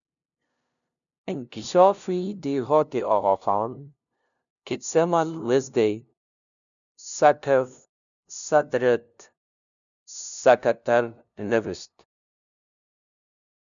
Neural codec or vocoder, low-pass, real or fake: codec, 16 kHz, 0.5 kbps, FunCodec, trained on LibriTTS, 25 frames a second; 7.2 kHz; fake